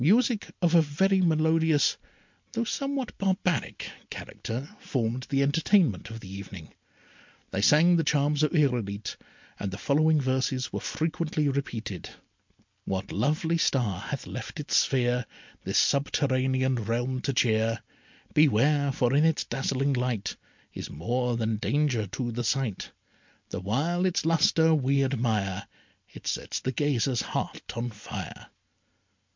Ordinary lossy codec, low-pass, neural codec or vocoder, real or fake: MP3, 64 kbps; 7.2 kHz; none; real